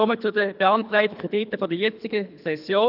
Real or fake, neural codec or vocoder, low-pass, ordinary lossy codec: fake; codec, 24 kHz, 3 kbps, HILCodec; 5.4 kHz; none